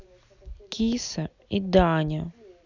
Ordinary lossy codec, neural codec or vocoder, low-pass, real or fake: none; none; 7.2 kHz; real